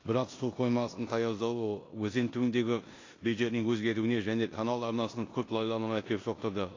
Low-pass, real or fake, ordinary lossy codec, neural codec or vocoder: 7.2 kHz; fake; AAC, 32 kbps; codec, 16 kHz in and 24 kHz out, 0.9 kbps, LongCat-Audio-Codec, four codebook decoder